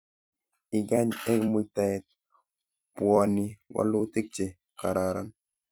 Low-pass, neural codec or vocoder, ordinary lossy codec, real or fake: none; vocoder, 44.1 kHz, 128 mel bands every 256 samples, BigVGAN v2; none; fake